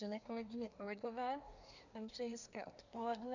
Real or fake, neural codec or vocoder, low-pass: fake; codec, 24 kHz, 1 kbps, SNAC; 7.2 kHz